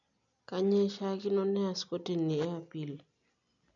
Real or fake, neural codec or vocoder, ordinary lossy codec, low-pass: real; none; none; 7.2 kHz